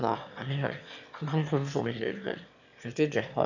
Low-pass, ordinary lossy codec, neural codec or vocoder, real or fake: 7.2 kHz; none; autoencoder, 22.05 kHz, a latent of 192 numbers a frame, VITS, trained on one speaker; fake